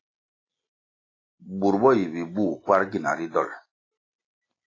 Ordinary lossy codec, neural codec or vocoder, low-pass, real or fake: AAC, 32 kbps; none; 7.2 kHz; real